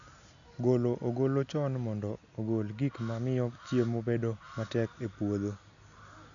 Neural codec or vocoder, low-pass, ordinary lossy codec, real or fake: none; 7.2 kHz; none; real